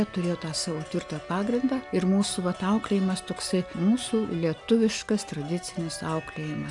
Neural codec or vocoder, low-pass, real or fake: none; 10.8 kHz; real